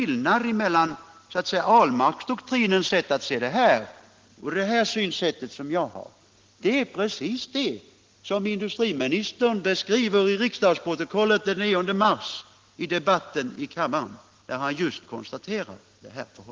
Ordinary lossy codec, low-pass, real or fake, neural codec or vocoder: Opus, 16 kbps; 7.2 kHz; real; none